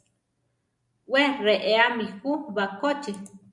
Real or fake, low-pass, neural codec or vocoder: real; 10.8 kHz; none